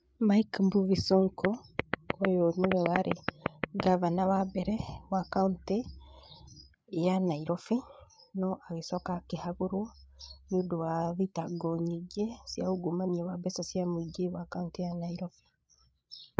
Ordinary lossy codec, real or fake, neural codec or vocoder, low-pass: none; fake; codec, 16 kHz, 8 kbps, FreqCodec, larger model; none